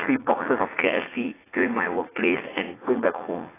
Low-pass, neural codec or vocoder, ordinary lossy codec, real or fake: 3.6 kHz; vocoder, 44.1 kHz, 80 mel bands, Vocos; AAC, 16 kbps; fake